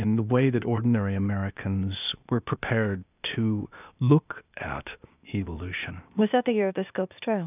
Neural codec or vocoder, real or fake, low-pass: codec, 16 kHz, 0.8 kbps, ZipCodec; fake; 3.6 kHz